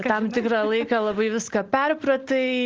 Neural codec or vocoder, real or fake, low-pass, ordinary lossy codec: none; real; 7.2 kHz; Opus, 16 kbps